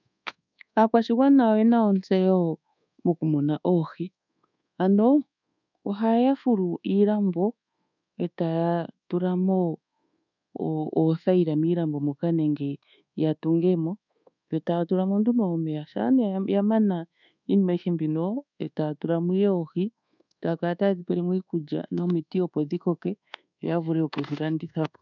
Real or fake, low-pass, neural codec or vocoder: fake; 7.2 kHz; codec, 24 kHz, 1.2 kbps, DualCodec